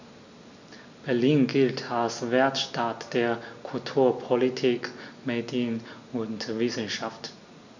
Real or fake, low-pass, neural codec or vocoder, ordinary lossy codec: real; 7.2 kHz; none; none